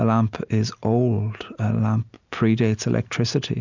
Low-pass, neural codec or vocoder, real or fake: 7.2 kHz; none; real